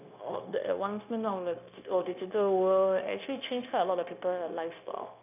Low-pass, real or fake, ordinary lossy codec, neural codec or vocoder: 3.6 kHz; fake; none; codec, 16 kHz, 0.9 kbps, LongCat-Audio-Codec